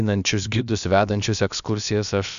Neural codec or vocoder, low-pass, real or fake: codec, 16 kHz, about 1 kbps, DyCAST, with the encoder's durations; 7.2 kHz; fake